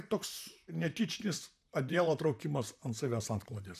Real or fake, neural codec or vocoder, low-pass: fake; vocoder, 44.1 kHz, 128 mel bands, Pupu-Vocoder; 14.4 kHz